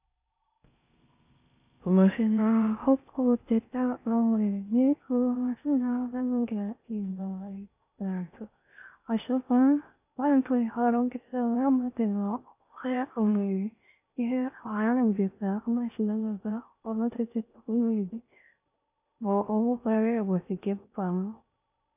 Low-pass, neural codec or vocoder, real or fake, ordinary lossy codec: 3.6 kHz; codec, 16 kHz in and 24 kHz out, 0.6 kbps, FocalCodec, streaming, 4096 codes; fake; AAC, 32 kbps